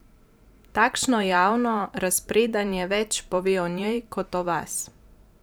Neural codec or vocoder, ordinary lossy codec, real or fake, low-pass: vocoder, 44.1 kHz, 128 mel bands every 512 samples, BigVGAN v2; none; fake; none